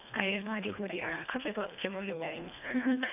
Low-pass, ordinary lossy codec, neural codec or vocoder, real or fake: 3.6 kHz; none; codec, 24 kHz, 1.5 kbps, HILCodec; fake